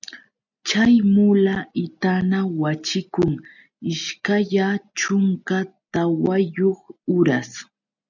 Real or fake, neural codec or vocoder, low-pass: real; none; 7.2 kHz